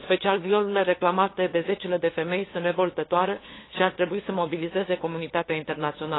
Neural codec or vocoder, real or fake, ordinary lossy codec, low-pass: codec, 16 kHz, 2 kbps, FunCodec, trained on LibriTTS, 25 frames a second; fake; AAC, 16 kbps; 7.2 kHz